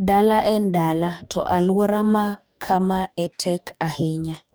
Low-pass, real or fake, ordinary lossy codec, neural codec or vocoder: none; fake; none; codec, 44.1 kHz, 2.6 kbps, DAC